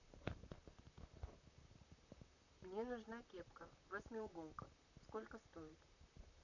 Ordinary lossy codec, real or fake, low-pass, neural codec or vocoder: none; fake; 7.2 kHz; vocoder, 44.1 kHz, 128 mel bands, Pupu-Vocoder